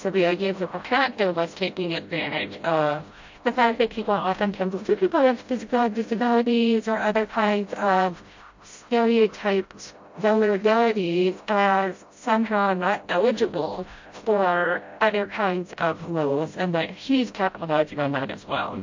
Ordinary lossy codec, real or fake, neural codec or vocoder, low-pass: MP3, 48 kbps; fake; codec, 16 kHz, 0.5 kbps, FreqCodec, smaller model; 7.2 kHz